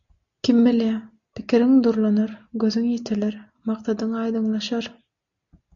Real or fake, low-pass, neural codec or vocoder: real; 7.2 kHz; none